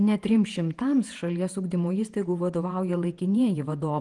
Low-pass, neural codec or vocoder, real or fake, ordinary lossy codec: 10.8 kHz; vocoder, 48 kHz, 128 mel bands, Vocos; fake; Opus, 24 kbps